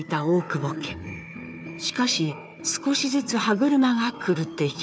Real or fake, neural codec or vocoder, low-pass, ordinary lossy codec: fake; codec, 16 kHz, 4 kbps, FunCodec, trained on Chinese and English, 50 frames a second; none; none